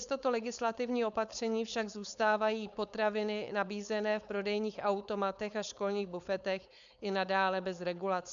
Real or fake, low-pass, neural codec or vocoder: fake; 7.2 kHz; codec, 16 kHz, 4.8 kbps, FACodec